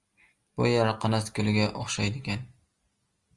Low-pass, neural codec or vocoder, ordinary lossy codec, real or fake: 10.8 kHz; none; Opus, 32 kbps; real